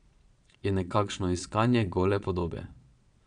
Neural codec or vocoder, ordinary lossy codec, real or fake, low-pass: vocoder, 22.05 kHz, 80 mel bands, Vocos; none; fake; 9.9 kHz